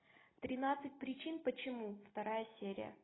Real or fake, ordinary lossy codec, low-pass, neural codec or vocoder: real; AAC, 16 kbps; 7.2 kHz; none